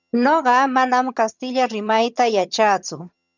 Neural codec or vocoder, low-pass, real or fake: vocoder, 22.05 kHz, 80 mel bands, HiFi-GAN; 7.2 kHz; fake